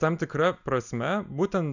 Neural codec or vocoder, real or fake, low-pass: none; real; 7.2 kHz